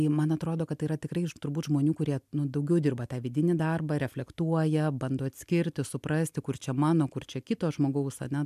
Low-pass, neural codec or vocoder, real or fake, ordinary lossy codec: 14.4 kHz; none; real; MP3, 96 kbps